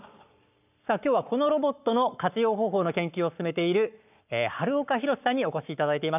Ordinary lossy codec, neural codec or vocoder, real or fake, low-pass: none; codec, 44.1 kHz, 7.8 kbps, Pupu-Codec; fake; 3.6 kHz